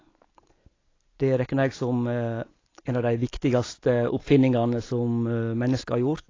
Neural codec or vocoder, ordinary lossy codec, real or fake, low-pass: none; AAC, 32 kbps; real; 7.2 kHz